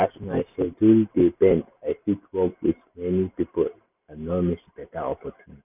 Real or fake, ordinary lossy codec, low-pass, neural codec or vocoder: real; none; 3.6 kHz; none